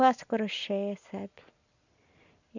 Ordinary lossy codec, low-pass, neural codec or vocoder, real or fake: none; 7.2 kHz; none; real